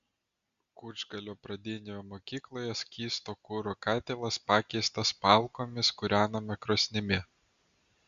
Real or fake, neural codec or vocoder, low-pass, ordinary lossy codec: real; none; 7.2 kHz; Opus, 64 kbps